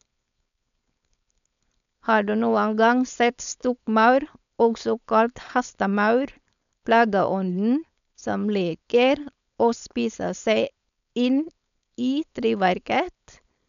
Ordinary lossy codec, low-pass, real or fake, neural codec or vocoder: none; 7.2 kHz; fake; codec, 16 kHz, 4.8 kbps, FACodec